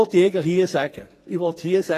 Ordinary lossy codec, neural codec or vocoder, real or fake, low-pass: AAC, 48 kbps; codec, 44.1 kHz, 2.6 kbps, SNAC; fake; 14.4 kHz